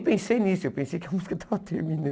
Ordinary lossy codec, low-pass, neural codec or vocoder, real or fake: none; none; none; real